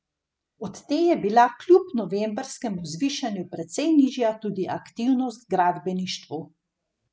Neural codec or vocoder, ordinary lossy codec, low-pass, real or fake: none; none; none; real